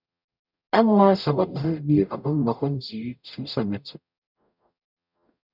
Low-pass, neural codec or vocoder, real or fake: 5.4 kHz; codec, 44.1 kHz, 0.9 kbps, DAC; fake